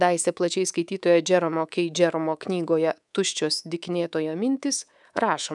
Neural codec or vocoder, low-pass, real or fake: codec, 24 kHz, 3.1 kbps, DualCodec; 10.8 kHz; fake